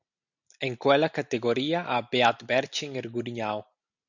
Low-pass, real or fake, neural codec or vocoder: 7.2 kHz; real; none